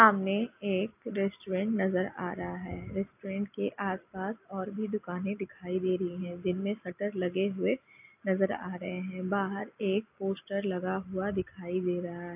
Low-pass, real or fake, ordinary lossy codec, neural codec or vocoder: 3.6 kHz; real; AAC, 32 kbps; none